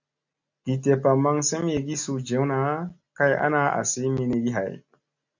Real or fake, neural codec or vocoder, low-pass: real; none; 7.2 kHz